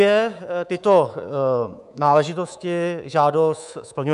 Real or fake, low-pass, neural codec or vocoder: fake; 10.8 kHz; codec, 24 kHz, 3.1 kbps, DualCodec